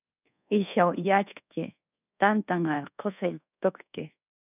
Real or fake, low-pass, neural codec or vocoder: fake; 3.6 kHz; codec, 24 kHz, 1.2 kbps, DualCodec